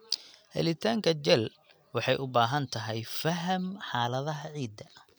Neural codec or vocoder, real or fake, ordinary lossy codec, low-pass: none; real; none; none